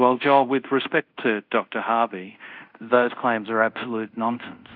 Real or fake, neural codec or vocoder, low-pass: fake; codec, 24 kHz, 0.5 kbps, DualCodec; 5.4 kHz